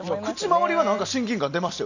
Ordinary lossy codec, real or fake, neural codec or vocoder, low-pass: none; real; none; 7.2 kHz